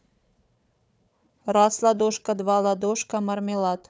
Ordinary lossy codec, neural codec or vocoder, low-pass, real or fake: none; codec, 16 kHz, 4 kbps, FunCodec, trained on Chinese and English, 50 frames a second; none; fake